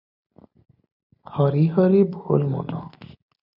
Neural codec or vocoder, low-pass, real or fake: none; 5.4 kHz; real